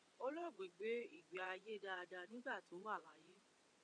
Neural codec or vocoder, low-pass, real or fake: vocoder, 22.05 kHz, 80 mel bands, WaveNeXt; 9.9 kHz; fake